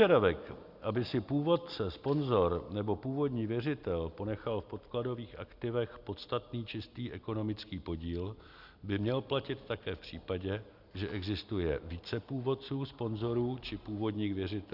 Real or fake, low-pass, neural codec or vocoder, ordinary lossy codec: real; 5.4 kHz; none; Opus, 64 kbps